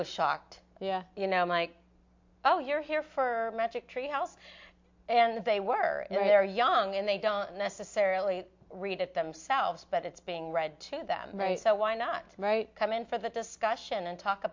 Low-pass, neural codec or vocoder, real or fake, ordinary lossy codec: 7.2 kHz; none; real; MP3, 48 kbps